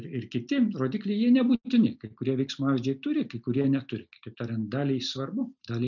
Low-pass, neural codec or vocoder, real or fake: 7.2 kHz; none; real